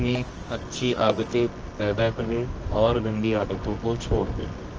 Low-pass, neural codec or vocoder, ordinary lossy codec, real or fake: 7.2 kHz; codec, 24 kHz, 0.9 kbps, WavTokenizer, medium music audio release; Opus, 24 kbps; fake